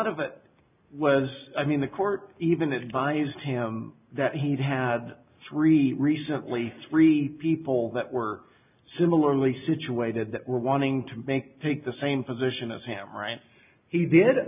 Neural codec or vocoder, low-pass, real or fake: none; 3.6 kHz; real